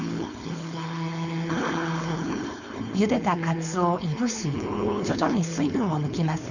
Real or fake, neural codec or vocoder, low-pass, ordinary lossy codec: fake; codec, 16 kHz, 4.8 kbps, FACodec; 7.2 kHz; none